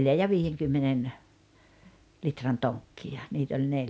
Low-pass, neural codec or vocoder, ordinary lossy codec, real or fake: none; none; none; real